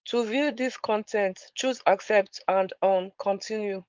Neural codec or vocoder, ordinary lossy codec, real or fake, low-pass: codec, 16 kHz, 4.8 kbps, FACodec; Opus, 32 kbps; fake; 7.2 kHz